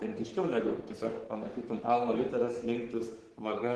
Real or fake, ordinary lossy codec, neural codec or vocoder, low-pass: fake; Opus, 16 kbps; codec, 44.1 kHz, 3.4 kbps, Pupu-Codec; 10.8 kHz